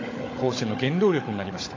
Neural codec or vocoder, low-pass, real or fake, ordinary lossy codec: codec, 16 kHz, 16 kbps, FunCodec, trained on Chinese and English, 50 frames a second; 7.2 kHz; fake; AAC, 32 kbps